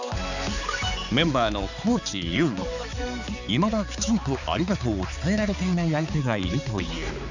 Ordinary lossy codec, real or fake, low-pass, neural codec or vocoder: none; fake; 7.2 kHz; codec, 16 kHz, 4 kbps, X-Codec, HuBERT features, trained on balanced general audio